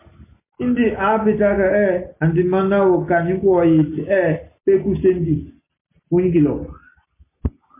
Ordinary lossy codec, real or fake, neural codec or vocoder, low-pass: MP3, 24 kbps; real; none; 3.6 kHz